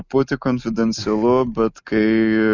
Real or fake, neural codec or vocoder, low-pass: real; none; 7.2 kHz